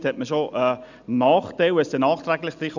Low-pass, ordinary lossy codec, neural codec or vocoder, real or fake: 7.2 kHz; none; none; real